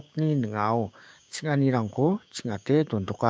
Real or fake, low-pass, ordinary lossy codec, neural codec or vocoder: fake; none; none; codec, 16 kHz, 6 kbps, DAC